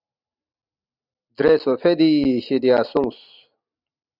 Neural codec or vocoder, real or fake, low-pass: none; real; 5.4 kHz